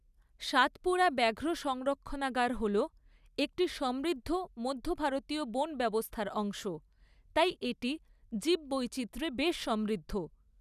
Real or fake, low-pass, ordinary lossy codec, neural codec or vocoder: real; 14.4 kHz; none; none